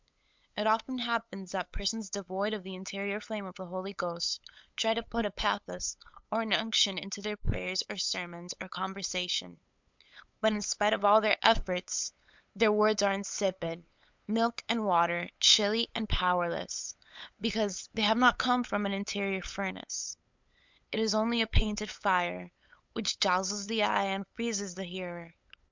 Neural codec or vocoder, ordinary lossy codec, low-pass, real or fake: codec, 16 kHz, 8 kbps, FunCodec, trained on LibriTTS, 25 frames a second; MP3, 64 kbps; 7.2 kHz; fake